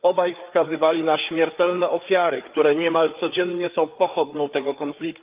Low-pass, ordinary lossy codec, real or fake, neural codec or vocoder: 3.6 kHz; Opus, 24 kbps; fake; codec, 16 kHz, 4 kbps, FreqCodec, larger model